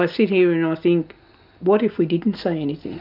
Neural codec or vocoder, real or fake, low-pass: none; real; 5.4 kHz